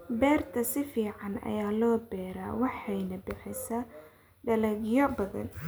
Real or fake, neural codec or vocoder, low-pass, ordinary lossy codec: real; none; none; none